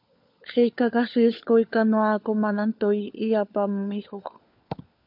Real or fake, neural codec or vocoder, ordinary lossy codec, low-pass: fake; codec, 16 kHz, 4 kbps, FunCodec, trained on LibriTTS, 50 frames a second; MP3, 48 kbps; 5.4 kHz